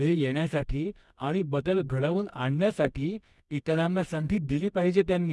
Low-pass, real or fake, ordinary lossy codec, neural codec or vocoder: none; fake; none; codec, 24 kHz, 0.9 kbps, WavTokenizer, medium music audio release